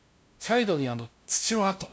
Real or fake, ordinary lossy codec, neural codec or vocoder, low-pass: fake; none; codec, 16 kHz, 0.5 kbps, FunCodec, trained on LibriTTS, 25 frames a second; none